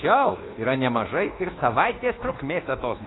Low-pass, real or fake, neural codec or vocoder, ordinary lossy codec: 7.2 kHz; fake; codec, 24 kHz, 1.2 kbps, DualCodec; AAC, 16 kbps